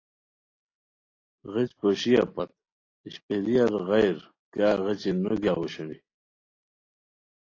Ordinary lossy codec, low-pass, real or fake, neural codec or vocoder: AAC, 32 kbps; 7.2 kHz; real; none